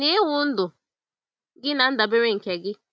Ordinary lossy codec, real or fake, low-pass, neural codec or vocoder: none; real; none; none